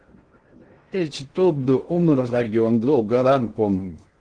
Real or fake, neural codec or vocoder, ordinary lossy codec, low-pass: fake; codec, 16 kHz in and 24 kHz out, 0.6 kbps, FocalCodec, streaming, 2048 codes; Opus, 16 kbps; 9.9 kHz